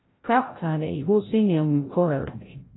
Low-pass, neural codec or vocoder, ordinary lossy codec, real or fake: 7.2 kHz; codec, 16 kHz, 0.5 kbps, FreqCodec, larger model; AAC, 16 kbps; fake